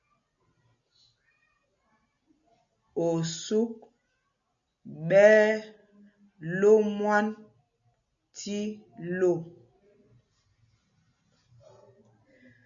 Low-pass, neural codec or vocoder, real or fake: 7.2 kHz; none; real